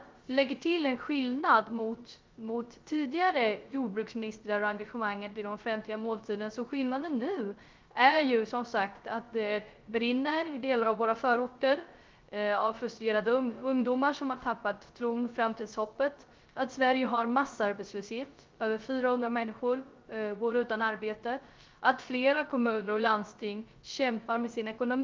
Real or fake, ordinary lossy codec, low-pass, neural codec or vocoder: fake; Opus, 32 kbps; 7.2 kHz; codec, 16 kHz, 0.3 kbps, FocalCodec